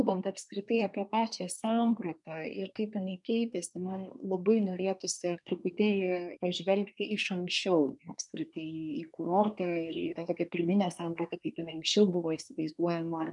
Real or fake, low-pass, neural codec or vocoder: fake; 10.8 kHz; codec, 24 kHz, 1 kbps, SNAC